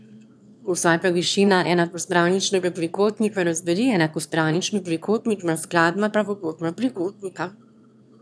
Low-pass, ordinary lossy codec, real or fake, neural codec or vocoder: none; none; fake; autoencoder, 22.05 kHz, a latent of 192 numbers a frame, VITS, trained on one speaker